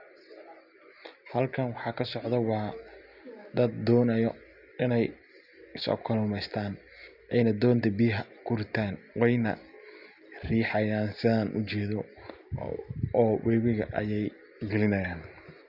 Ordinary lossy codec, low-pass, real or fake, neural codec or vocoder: Opus, 64 kbps; 5.4 kHz; real; none